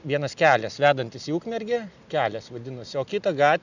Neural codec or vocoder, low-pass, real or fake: none; 7.2 kHz; real